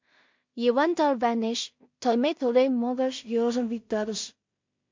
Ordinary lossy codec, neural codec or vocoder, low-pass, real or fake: MP3, 48 kbps; codec, 16 kHz in and 24 kHz out, 0.4 kbps, LongCat-Audio-Codec, two codebook decoder; 7.2 kHz; fake